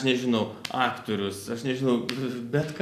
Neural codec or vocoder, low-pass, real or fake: none; 14.4 kHz; real